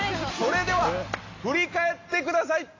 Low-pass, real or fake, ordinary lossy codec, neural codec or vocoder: 7.2 kHz; real; AAC, 32 kbps; none